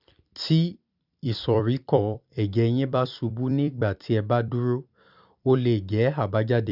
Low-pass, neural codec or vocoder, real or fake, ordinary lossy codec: 5.4 kHz; vocoder, 44.1 kHz, 128 mel bands every 256 samples, BigVGAN v2; fake; none